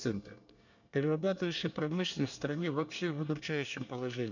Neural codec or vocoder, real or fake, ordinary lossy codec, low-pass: codec, 24 kHz, 1 kbps, SNAC; fake; none; 7.2 kHz